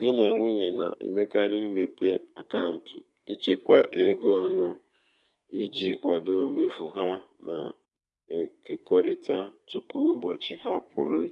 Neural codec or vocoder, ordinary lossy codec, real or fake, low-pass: codec, 24 kHz, 1 kbps, SNAC; none; fake; 10.8 kHz